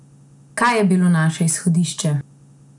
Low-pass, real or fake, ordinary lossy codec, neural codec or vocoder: 10.8 kHz; real; none; none